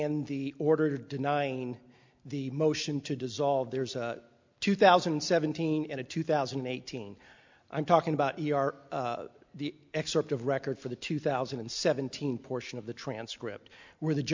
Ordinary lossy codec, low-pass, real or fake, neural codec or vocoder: MP3, 64 kbps; 7.2 kHz; real; none